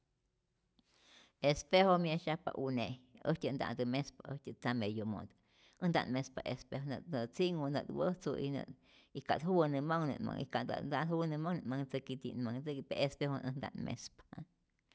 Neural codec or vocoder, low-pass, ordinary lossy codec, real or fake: none; none; none; real